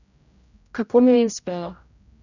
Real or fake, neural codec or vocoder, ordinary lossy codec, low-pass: fake; codec, 16 kHz, 0.5 kbps, X-Codec, HuBERT features, trained on general audio; none; 7.2 kHz